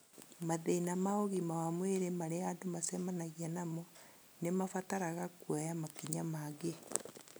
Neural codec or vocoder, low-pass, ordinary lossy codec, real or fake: none; none; none; real